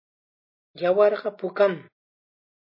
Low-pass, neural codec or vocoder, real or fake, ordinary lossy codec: 5.4 kHz; none; real; MP3, 24 kbps